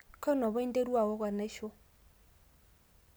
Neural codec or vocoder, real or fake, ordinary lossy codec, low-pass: none; real; none; none